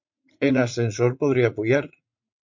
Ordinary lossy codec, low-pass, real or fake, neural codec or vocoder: MP3, 48 kbps; 7.2 kHz; fake; vocoder, 44.1 kHz, 80 mel bands, Vocos